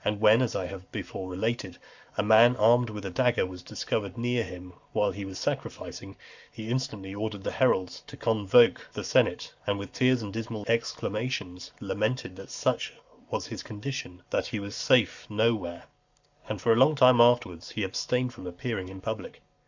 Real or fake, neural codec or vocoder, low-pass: fake; codec, 44.1 kHz, 7.8 kbps, Pupu-Codec; 7.2 kHz